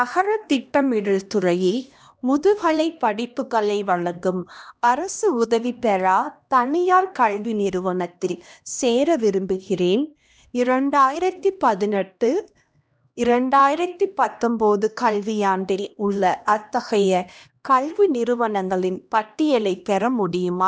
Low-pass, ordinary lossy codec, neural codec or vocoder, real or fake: none; none; codec, 16 kHz, 1 kbps, X-Codec, HuBERT features, trained on LibriSpeech; fake